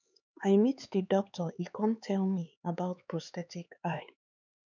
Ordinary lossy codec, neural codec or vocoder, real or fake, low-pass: none; codec, 16 kHz, 4 kbps, X-Codec, HuBERT features, trained on LibriSpeech; fake; 7.2 kHz